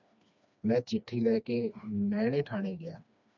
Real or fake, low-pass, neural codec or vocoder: fake; 7.2 kHz; codec, 16 kHz, 2 kbps, FreqCodec, smaller model